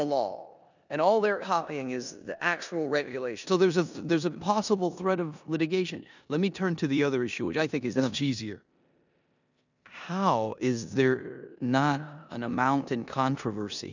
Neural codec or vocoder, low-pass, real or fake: codec, 16 kHz in and 24 kHz out, 0.9 kbps, LongCat-Audio-Codec, four codebook decoder; 7.2 kHz; fake